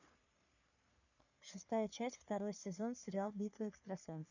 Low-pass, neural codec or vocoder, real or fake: 7.2 kHz; codec, 44.1 kHz, 3.4 kbps, Pupu-Codec; fake